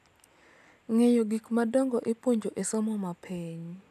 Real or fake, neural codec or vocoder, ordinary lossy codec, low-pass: real; none; none; 14.4 kHz